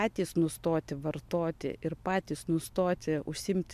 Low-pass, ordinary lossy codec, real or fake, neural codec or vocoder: 14.4 kHz; MP3, 96 kbps; real; none